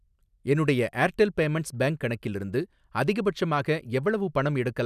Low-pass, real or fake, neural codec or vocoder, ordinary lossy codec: 14.4 kHz; real; none; none